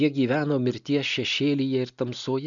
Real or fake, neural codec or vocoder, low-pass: real; none; 7.2 kHz